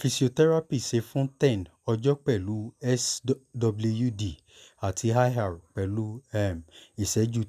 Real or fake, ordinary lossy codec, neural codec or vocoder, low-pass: real; none; none; 14.4 kHz